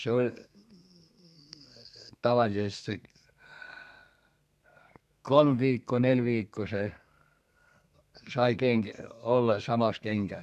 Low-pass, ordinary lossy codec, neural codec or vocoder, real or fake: 14.4 kHz; none; codec, 32 kHz, 1.9 kbps, SNAC; fake